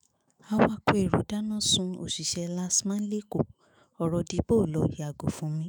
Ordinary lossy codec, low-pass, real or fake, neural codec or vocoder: none; none; fake; autoencoder, 48 kHz, 128 numbers a frame, DAC-VAE, trained on Japanese speech